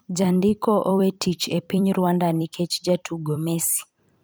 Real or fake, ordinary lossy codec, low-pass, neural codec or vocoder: fake; none; none; vocoder, 44.1 kHz, 128 mel bands every 256 samples, BigVGAN v2